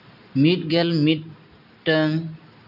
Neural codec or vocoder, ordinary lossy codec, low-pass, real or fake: none; none; 5.4 kHz; real